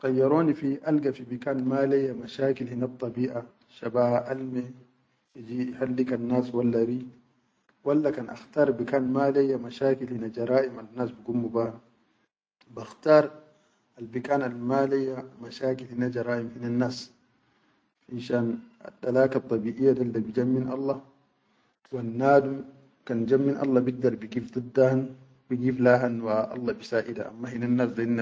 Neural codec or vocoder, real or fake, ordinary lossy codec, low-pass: none; real; none; none